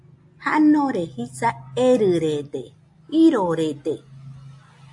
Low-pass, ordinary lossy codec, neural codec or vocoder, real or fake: 10.8 kHz; AAC, 64 kbps; none; real